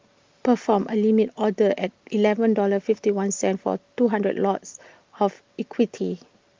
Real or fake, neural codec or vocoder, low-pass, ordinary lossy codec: real; none; 7.2 kHz; Opus, 32 kbps